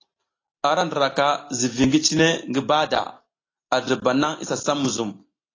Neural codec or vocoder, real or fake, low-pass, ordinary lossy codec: none; real; 7.2 kHz; AAC, 32 kbps